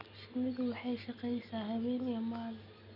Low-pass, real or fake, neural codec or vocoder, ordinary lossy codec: 5.4 kHz; real; none; none